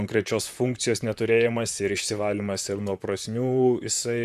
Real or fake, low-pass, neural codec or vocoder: fake; 14.4 kHz; vocoder, 44.1 kHz, 128 mel bands, Pupu-Vocoder